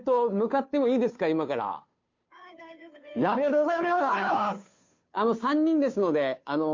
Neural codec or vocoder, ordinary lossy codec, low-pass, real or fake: codec, 16 kHz, 2 kbps, FunCodec, trained on Chinese and English, 25 frames a second; MP3, 48 kbps; 7.2 kHz; fake